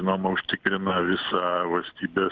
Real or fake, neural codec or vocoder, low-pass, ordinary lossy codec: real; none; 7.2 kHz; Opus, 32 kbps